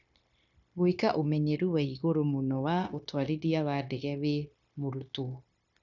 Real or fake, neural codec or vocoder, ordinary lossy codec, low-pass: fake; codec, 16 kHz, 0.9 kbps, LongCat-Audio-Codec; none; 7.2 kHz